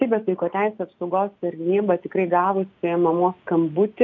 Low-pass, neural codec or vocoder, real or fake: 7.2 kHz; none; real